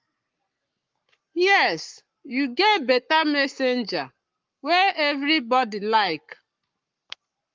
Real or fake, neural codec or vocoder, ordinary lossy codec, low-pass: fake; codec, 44.1 kHz, 7.8 kbps, Pupu-Codec; Opus, 24 kbps; 7.2 kHz